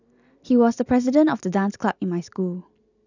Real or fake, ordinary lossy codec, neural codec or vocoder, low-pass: real; none; none; 7.2 kHz